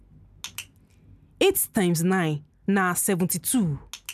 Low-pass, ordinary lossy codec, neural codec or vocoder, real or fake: 14.4 kHz; none; none; real